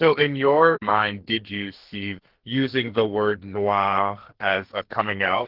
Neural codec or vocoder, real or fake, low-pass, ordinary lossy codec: codec, 44.1 kHz, 2.6 kbps, SNAC; fake; 5.4 kHz; Opus, 16 kbps